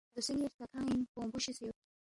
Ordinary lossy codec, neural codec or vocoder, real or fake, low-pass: MP3, 48 kbps; none; real; 10.8 kHz